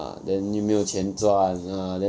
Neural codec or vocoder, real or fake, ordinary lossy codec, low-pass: none; real; none; none